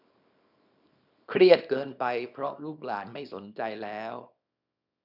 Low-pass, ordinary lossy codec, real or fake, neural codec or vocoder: 5.4 kHz; none; fake; codec, 24 kHz, 0.9 kbps, WavTokenizer, small release